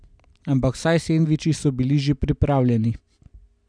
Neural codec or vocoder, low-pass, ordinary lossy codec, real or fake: none; 9.9 kHz; none; real